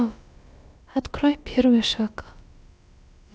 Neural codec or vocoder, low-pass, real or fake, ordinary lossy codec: codec, 16 kHz, about 1 kbps, DyCAST, with the encoder's durations; none; fake; none